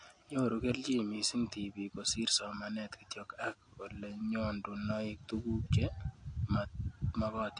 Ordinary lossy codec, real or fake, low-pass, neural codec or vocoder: MP3, 48 kbps; real; 9.9 kHz; none